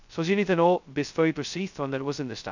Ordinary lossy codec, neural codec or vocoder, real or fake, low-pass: none; codec, 16 kHz, 0.2 kbps, FocalCodec; fake; 7.2 kHz